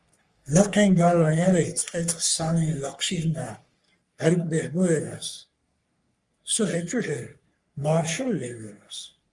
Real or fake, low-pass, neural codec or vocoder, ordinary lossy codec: fake; 10.8 kHz; codec, 44.1 kHz, 3.4 kbps, Pupu-Codec; Opus, 32 kbps